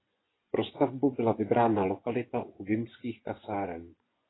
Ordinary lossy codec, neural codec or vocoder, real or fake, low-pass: AAC, 16 kbps; vocoder, 24 kHz, 100 mel bands, Vocos; fake; 7.2 kHz